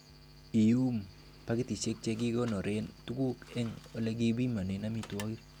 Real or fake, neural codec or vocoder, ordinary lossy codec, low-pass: real; none; none; 19.8 kHz